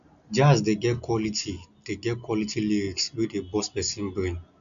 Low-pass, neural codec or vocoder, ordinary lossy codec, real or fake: 7.2 kHz; none; none; real